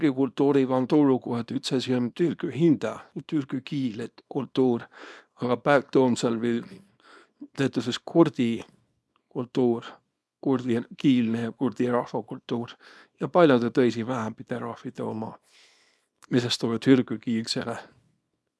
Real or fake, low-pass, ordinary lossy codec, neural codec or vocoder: fake; none; none; codec, 24 kHz, 0.9 kbps, WavTokenizer, small release